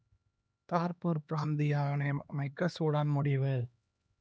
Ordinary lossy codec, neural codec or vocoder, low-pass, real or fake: none; codec, 16 kHz, 2 kbps, X-Codec, HuBERT features, trained on LibriSpeech; none; fake